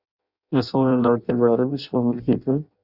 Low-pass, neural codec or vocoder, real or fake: 5.4 kHz; codec, 16 kHz in and 24 kHz out, 0.6 kbps, FireRedTTS-2 codec; fake